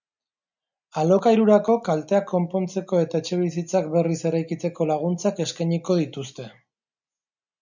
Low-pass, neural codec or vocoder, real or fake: 7.2 kHz; none; real